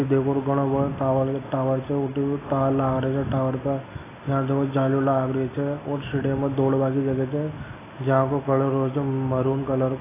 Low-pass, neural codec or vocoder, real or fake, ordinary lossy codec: 3.6 kHz; none; real; AAC, 16 kbps